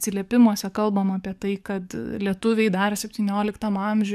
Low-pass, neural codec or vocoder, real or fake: 14.4 kHz; codec, 44.1 kHz, 7.8 kbps, DAC; fake